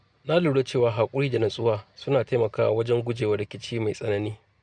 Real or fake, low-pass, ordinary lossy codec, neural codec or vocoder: real; 9.9 kHz; none; none